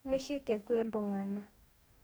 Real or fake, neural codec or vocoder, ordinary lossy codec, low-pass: fake; codec, 44.1 kHz, 2.6 kbps, DAC; none; none